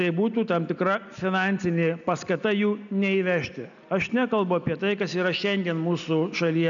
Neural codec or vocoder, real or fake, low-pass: none; real; 7.2 kHz